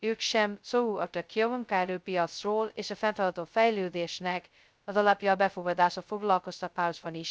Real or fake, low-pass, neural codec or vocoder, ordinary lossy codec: fake; none; codec, 16 kHz, 0.2 kbps, FocalCodec; none